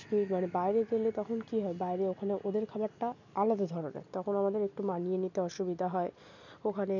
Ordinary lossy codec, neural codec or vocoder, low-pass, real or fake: none; none; 7.2 kHz; real